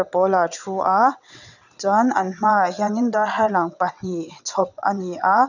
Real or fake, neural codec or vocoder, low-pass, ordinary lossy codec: fake; vocoder, 22.05 kHz, 80 mel bands, WaveNeXt; 7.2 kHz; none